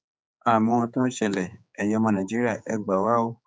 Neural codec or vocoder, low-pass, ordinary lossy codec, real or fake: codec, 16 kHz, 4 kbps, X-Codec, HuBERT features, trained on general audio; none; none; fake